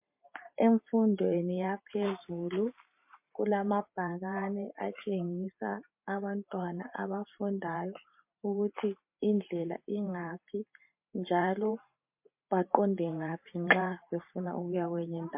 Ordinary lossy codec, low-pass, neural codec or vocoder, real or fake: MP3, 32 kbps; 3.6 kHz; vocoder, 44.1 kHz, 128 mel bands every 512 samples, BigVGAN v2; fake